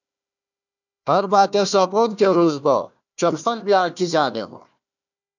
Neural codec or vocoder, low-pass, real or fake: codec, 16 kHz, 1 kbps, FunCodec, trained on Chinese and English, 50 frames a second; 7.2 kHz; fake